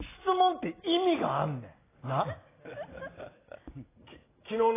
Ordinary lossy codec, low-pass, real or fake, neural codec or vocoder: AAC, 16 kbps; 3.6 kHz; real; none